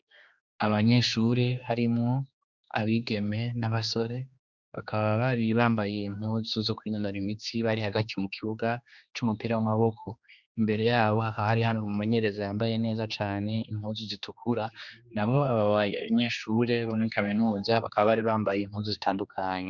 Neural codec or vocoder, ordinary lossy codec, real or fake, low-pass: codec, 16 kHz, 2 kbps, X-Codec, HuBERT features, trained on general audio; Opus, 64 kbps; fake; 7.2 kHz